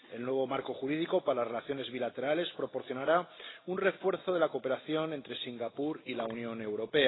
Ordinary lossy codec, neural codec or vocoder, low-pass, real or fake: AAC, 16 kbps; none; 7.2 kHz; real